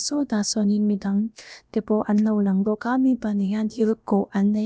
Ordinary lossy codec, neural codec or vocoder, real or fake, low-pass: none; codec, 16 kHz, about 1 kbps, DyCAST, with the encoder's durations; fake; none